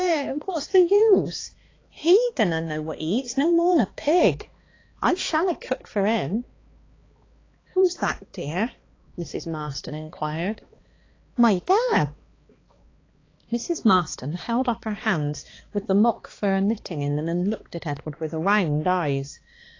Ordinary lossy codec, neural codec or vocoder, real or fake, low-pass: AAC, 32 kbps; codec, 16 kHz, 2 kbps, X-Codec, HuBERT features, trained on balanced general audio; fake; 7.2 kHz